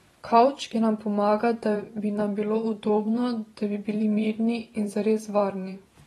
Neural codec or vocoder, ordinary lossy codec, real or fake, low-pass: vocoder, 44.1 kHz, 128 mel bands every 512 samples, BigVGAN v2; AAC, 32 kbps; fake; 19.8 kHz